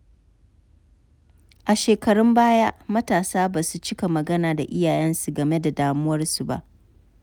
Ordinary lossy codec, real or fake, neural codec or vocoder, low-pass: none; real; none; none